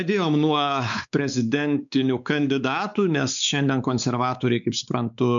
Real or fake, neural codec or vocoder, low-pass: fake; codec, 16 kHz, 4 kbps, X-Codec, WavLM features, trained on Multilingual LibriSpeech; 7.2 kHz